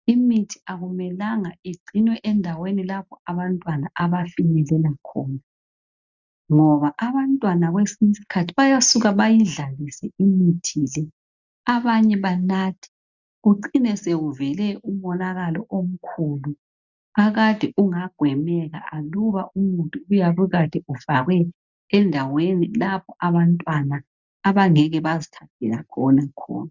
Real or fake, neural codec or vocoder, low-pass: real; none; 7.2 kHz